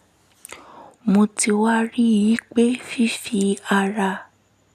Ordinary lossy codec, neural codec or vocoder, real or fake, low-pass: none; none; real; 14.4 kHz